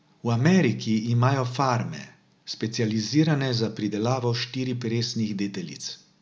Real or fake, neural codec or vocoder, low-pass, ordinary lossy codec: real; none; none; none